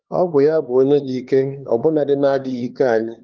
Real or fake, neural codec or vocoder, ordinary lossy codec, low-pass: fake; codec, 16 kHz, 2 kbps, X-Codec, HuBERT features, trained on LibriSpeech; Opus, 32 kbps; 7.2 kHz